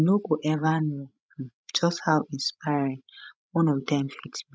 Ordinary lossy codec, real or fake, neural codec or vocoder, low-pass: none; fake; codec, 16 kHz, 16 kbps, FreqCodec, larger model; none